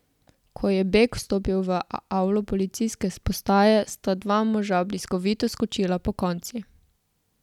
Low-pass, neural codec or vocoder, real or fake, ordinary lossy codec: 19.8 kHz; none; real; none